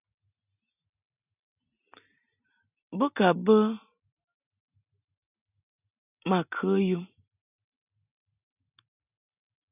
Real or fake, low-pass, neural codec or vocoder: real; 3.6 kHz; none